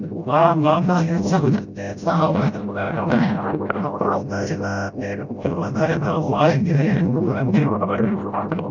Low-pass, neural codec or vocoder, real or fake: 7.2 kHz; codec, 16 kHz, 0.5 kbps, FreqCodec, smaller model; fake